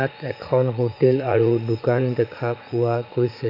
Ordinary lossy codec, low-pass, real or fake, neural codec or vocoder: none; 5.4 kHz; fake; vocoder, 44.1 kHz, 80 mel bands, Vocos